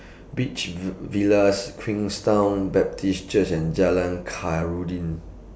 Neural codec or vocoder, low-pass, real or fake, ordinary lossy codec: none; none; real; none